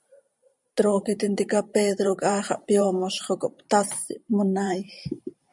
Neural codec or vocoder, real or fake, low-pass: vocoder, 44.1 kHz, 128 mel bands every 512 samples, BigVGAN v2; fake; 10.8 kHz